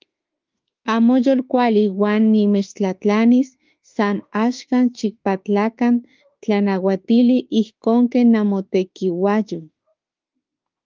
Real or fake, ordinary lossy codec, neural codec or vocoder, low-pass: fake; Opus, 32 kbps; autoencoder, 48 kHz, 32 numbers a frame, DAC-VAE, trained on Japanese speech; 7.2 kHz